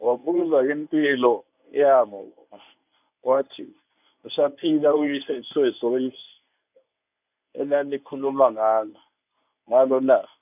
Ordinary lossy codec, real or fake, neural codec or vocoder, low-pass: none; fake; codec, 24 kHz, 0.9 kbps, WavTokenizer, medium speech release version 1; 3.6 kHz